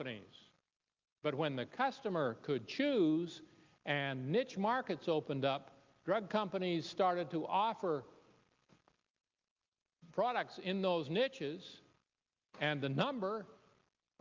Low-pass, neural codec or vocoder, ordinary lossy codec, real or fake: 7.2 kHz; none; Opus, 24 kbps; real